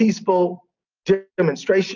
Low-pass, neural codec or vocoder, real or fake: 7.2 kHz; none; real